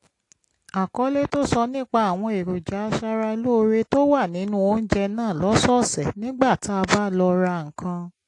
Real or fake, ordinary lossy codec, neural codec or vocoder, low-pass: real; AAC, 48 kbps; none; 10.8 kHz